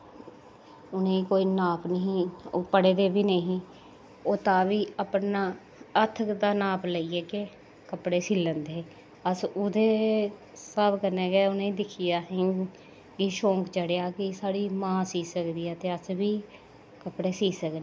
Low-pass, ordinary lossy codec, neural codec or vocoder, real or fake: none; none; none; real